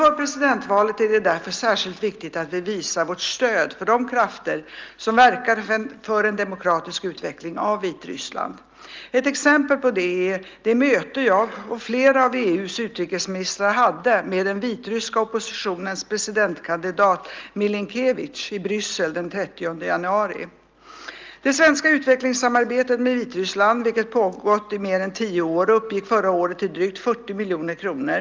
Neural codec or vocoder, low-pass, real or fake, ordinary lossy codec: none; 7.2 kHz; real; Opus, 32 kbps